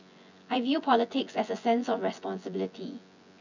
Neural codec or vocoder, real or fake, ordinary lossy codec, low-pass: vocoder, 24 kHz, 100 mel bands, Vocos; fake; none; 7.2 kHz